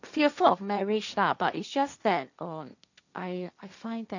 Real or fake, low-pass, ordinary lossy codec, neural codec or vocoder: fake; 7.2 kHz; none; codec, 16 kHz, 1.1 kbps, Voila-Tokenizer